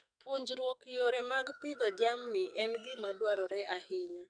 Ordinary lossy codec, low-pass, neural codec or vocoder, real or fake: none; 9.9 kHz; codec, 44.1 kHz, 2.6 kbps, SNAC; fake